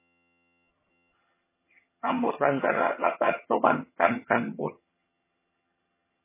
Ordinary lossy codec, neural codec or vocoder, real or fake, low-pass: MP3, 16 kbps; vocoder, 22.05 kHz, 80 mel bands, HiFi-GAN; fake; 3.6 kHz